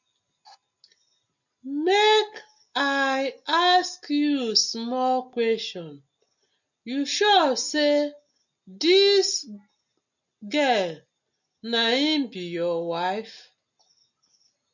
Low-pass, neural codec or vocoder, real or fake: 7.2 kHz; none; real